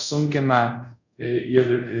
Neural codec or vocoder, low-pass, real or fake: codec, 24 kHz, 0.5 kbps, DualCodec; 7.2 kHz; fake